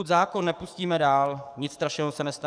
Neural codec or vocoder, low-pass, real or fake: codec, 44.1 kHz, 7.8 kbps, DAC; 9.9 kHz; fake